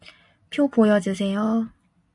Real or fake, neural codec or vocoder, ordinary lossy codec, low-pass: real; none; MP3, 64 kbps; 10.8 kHz